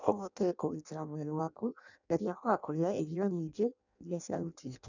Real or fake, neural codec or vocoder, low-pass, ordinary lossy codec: fake; codec, 16 kHz in and 24 kHz out, 0.6 kbps, FireRedTTS-2 codec; 7.2 kHz; none